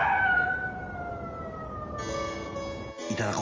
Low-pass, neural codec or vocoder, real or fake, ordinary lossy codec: 7.2 kHz; none; real; Opus, 24 kbps